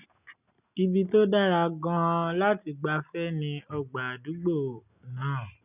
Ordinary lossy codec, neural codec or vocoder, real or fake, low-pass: none; none; real; 3.6 kHz